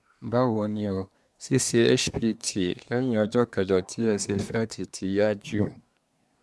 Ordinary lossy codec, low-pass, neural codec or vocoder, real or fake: none; none; codec, 24 kHz, 1 kbps, SNAC; fake